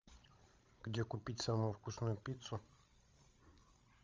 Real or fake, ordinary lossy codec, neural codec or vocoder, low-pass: fake; Opus, 32 kbps; codec, 16 kHz, 16 kbps, FreqCodec, larger model; 7.2 kHz